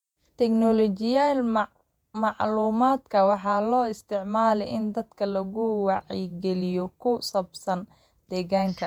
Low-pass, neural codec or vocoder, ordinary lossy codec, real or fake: 19.8 kHz; vocoder, 48 kHz, 128 mel bands, Vocos; MP3, 96 kbps; fake